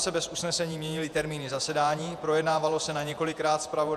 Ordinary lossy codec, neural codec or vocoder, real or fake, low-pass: AAC, 96 kbps; vocoder, 48 kHz, 128 mel bands, Vocos; fake; 14.4 kHz